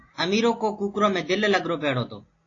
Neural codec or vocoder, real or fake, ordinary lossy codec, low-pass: none; real; AAC, 32 kbps; 7.2 kHz